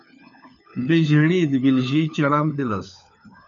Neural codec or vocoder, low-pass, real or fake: codec, 16 kHz, 4 kbps, FunCodec, trained on LibriTTS, 50 frames a second; 7.2 kHz; fake